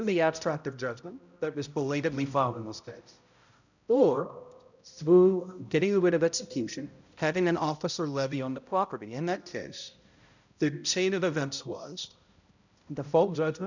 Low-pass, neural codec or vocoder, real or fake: 7.2 kHz; codec, 16 kHz, 0.5 kbps, X-Codec, HuBERT features, trained on balanced general audio; fake